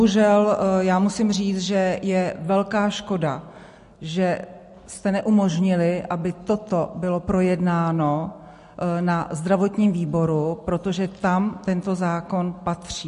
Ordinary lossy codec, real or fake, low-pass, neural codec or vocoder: MP3, 48 kbps; real; 10.8 kHz; none